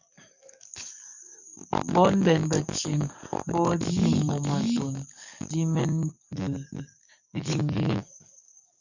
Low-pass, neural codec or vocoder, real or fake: 7.2 kHz; codec, 44.1 kHz, 7.8 kbps, DAC; fake